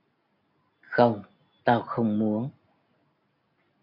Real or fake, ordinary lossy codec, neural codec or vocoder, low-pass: real; AAC, 48 kbps; none; 5.4 kHz